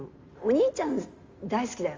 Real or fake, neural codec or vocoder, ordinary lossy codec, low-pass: real; none; Opus, 32 kbps; 7.2 kHz